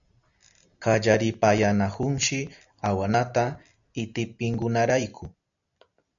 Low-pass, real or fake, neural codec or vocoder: 7.2 kHz; real; none